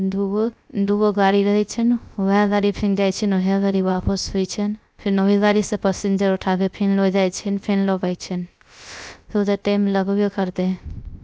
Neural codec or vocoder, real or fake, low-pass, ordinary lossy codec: codec, 16 kHz, 0.3 kbps, FocalCodec; fake; none; none